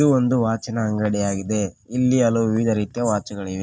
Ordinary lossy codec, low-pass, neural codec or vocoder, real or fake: none; none; none; real